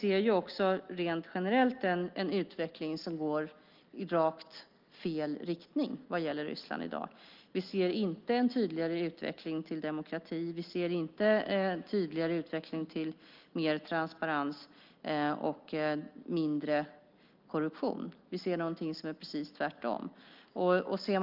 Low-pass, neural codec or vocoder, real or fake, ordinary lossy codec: 5.4 kHz; none; real; Opus, 32 kbps